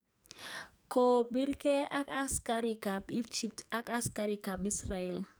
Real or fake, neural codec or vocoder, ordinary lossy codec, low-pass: fake; codec, 44.1 kHz, 2.6 kbps, SNAC; none; none